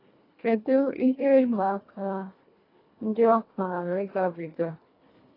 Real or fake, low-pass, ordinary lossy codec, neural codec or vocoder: fake; 5.4 kHz; AAC, 24 kbps; codec, 24 kHz, 1.5 kbps, HILCodec